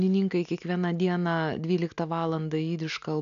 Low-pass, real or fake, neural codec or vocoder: 7.2 kHz; real; none